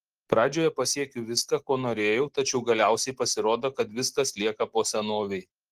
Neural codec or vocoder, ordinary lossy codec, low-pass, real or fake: none; Opus, 16 kbps; 14.4 kHz; real